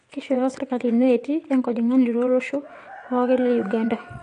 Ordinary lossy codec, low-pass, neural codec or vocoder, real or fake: AAC, 48 kbps; 9.9 kHz; vocoder, 22.05 kHz, 80 mel bands, WaveNeXt; fake